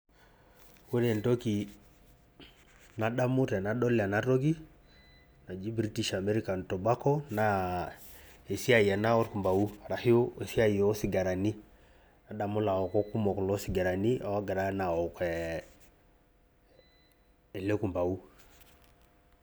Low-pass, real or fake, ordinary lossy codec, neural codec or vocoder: none; real; none; none